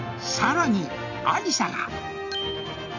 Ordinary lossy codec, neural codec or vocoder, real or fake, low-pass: none; none; real; 7.2 kHz